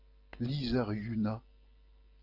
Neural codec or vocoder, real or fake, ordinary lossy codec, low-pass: none; real; Opus, 24 kbps; 5.4 kHz